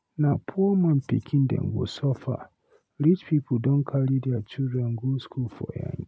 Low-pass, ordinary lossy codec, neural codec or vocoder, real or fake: none; none; none; real